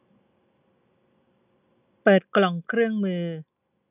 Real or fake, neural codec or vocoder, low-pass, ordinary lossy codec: real; none; 3.6 kHz; none